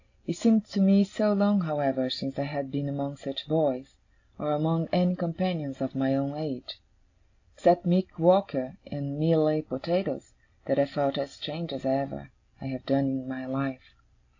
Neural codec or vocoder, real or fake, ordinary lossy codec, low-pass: none; real; AAC, 48 kbps; 7.2 kHz